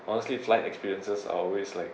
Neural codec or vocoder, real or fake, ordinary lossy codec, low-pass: none; real; none; none